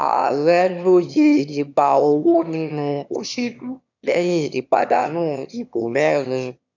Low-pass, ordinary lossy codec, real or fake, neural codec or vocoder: 7.2 kHz; none; fake; autoencoder, 22.05 kHz, a latent of 192 numbers a frame, VITS, trained on one speaker